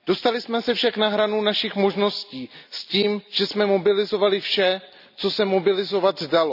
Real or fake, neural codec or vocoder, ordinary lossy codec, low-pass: real; none; none; 5.4 kHz